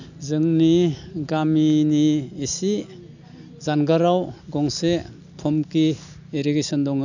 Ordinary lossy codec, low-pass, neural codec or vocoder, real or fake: none; 7.2 kHz; none; real